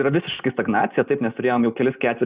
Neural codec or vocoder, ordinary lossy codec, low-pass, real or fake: none; Opus, 64 kbps; 3.6 kHz; real